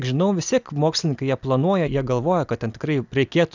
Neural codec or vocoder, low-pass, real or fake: none; 7.2 kHz; real